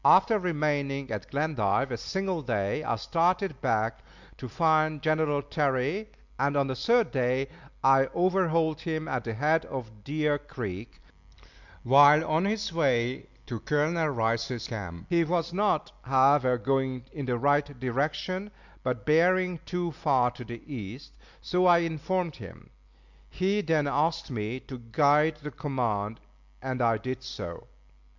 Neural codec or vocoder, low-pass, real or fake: none; 7.2 kHz; real